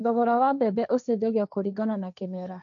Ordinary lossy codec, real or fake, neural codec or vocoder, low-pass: none; fake; codec, 16 kHz, 1.1 kbps, Voila-Tokenizer; 7.2 kHz